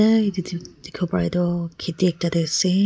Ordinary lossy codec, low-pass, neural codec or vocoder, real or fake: none; none; none; real